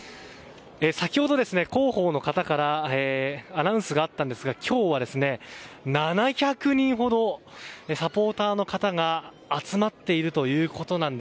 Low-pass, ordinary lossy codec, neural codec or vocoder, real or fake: none; none; none; real